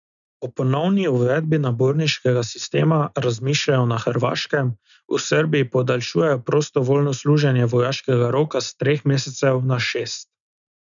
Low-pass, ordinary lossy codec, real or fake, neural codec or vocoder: 7.2 kHz; none; real; none